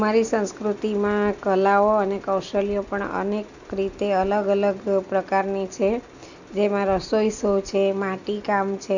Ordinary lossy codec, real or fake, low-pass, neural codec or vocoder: none; real; 7.2 kHz; none